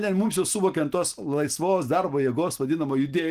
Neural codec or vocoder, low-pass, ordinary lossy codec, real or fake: none; 14.4 kHz; Opus, 24 kbps; real